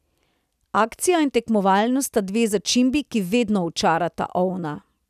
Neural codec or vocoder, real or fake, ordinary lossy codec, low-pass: none; real; none; 14.4 kHz